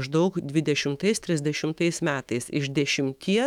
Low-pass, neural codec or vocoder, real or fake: 19.8 kHz; autoencoder, 48 kHz, 128 numbers a frame, DAC-VAE, trained on Japanese speech; fake